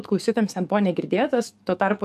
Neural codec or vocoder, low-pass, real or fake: codec, 44.1 kHz, 7.8 kbps, DAC; 14.4 kHz; fake